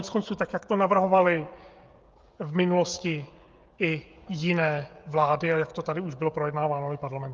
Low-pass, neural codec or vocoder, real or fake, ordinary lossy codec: 7.2 kHz; codec, 16 kHz, 16 kbps, FreqCodec, smaller model; fake; Opus, 32 kbps